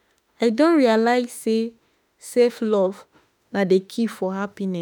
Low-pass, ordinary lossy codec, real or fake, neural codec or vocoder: none; none; fake; autoencoder, 48 kHz, 32 numbers a frame, DAC-VAE, trained on Japanese speech